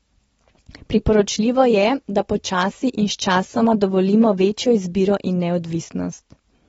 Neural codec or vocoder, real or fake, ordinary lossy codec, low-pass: none; real; AAC, 24 kbps; 10.8 kHz